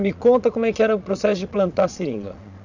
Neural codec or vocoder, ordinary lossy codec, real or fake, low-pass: vocoder, 22.05 kHz, 80 mel bands, Vocos; none; fake; 7.2 kHz